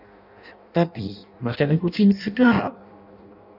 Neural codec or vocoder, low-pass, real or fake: codec, 16 kHz in and 24 kHz out, 0.6 kbps, FireRedTTS-2 codec; 5.4 kHz; fake